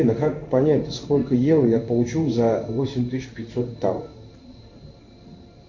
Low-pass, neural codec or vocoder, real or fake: 7.2 kHz; codec, 16 kHz in and 24 kHz out, 1 kbps, XY-Tokenizer; fake